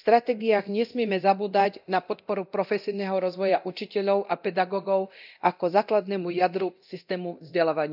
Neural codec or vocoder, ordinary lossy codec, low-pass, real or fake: codec, 24 kHz, 0.9 kbps, DualCodec; none; 5.4 kHz; fake